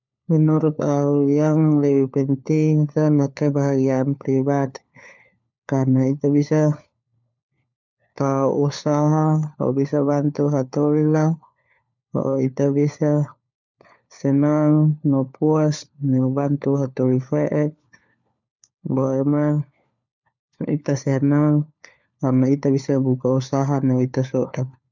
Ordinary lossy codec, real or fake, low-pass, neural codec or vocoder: none; fake; 7.2 kHz; codec, 16 kHz, 4 kbps, FunCodec, trained on LibriTTS, 50 frames a second